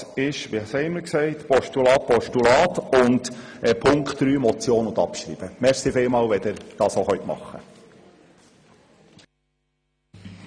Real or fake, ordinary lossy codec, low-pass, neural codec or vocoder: real; none; 9.9 kHz; none